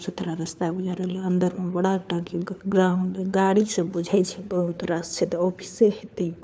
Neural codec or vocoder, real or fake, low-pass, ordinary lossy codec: codec, 16 kHz, 4 kbps, FunCodec, trained on LibriTTS, 50 frames a second; fake; none; none